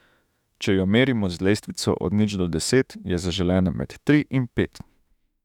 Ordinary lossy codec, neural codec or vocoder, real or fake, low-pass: none; autoencoder, 48 kHz, 32 numbers a frame, DAC-VAE, trained on Japanese speech; fake; 19.8 kHz